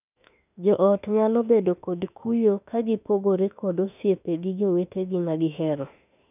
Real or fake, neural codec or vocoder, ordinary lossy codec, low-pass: fake; autoencoder, 48 kHz, 32 numbers a frame, DAC-VAE, trained on Japanese speech; none; 3.6 kHz